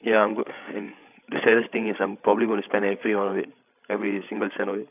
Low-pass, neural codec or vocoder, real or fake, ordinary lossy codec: 3.6 kHz; codec, 16 kHz, 8 kbps, FreqCodec, larger model; fake; none